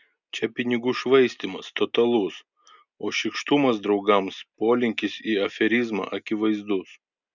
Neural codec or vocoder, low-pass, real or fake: none; 7.2 kHz; real